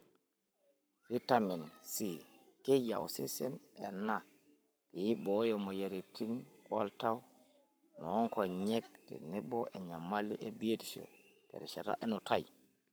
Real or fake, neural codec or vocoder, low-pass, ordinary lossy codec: fake; codec, 44.1 kHz, 7.8 kbps, Pupu-Codec; none; none